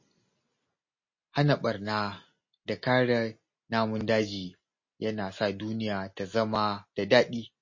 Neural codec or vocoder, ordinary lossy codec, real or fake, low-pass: none; MP3, 32 kbps; real; 7.2 kHz